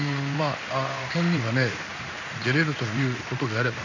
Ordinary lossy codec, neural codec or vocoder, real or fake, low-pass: none; codec, 16 kHz in and 24 kHz out, 1 kbps, XY-Tokenizer; fake; 7.2 kHz